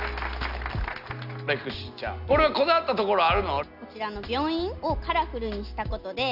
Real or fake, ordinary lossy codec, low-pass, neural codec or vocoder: real; none; 5.4 kHz; none